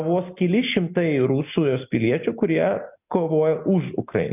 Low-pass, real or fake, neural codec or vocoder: 3.6 kHz; real; none